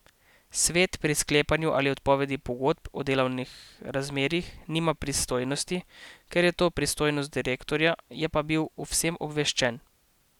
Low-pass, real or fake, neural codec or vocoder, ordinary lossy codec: 19.8 kHz; real; none; none